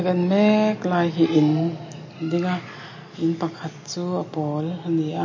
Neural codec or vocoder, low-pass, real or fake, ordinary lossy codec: none; 7.2 kHz; real; MP3, 32 kbps